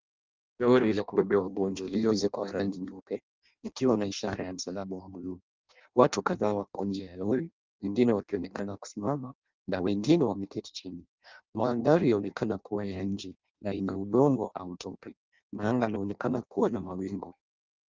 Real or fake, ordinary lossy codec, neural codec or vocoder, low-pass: fake; Opus, 24 kbps; codec, 16 kHz in and 24 kHz out, 0.6 kbps, FireRedTTS-2 codec; 7.2 kHz